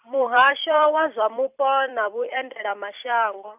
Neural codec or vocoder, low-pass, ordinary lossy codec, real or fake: vocoder, 44.1 kHz, 128 mel bands every 256 samples, BigVGAN v2; 3.6 kHz; none; fake